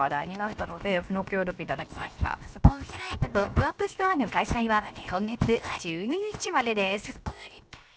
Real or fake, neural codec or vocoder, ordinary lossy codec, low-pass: fake; codec, 16 kHz, 0.7 kbps, FocalCodec; none; none